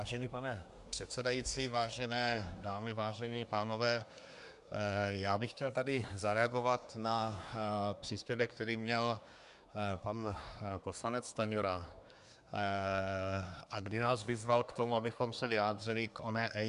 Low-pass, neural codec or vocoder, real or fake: 10.8 kHz; codec, 24 kHz, 1 kbps, SNAC; fake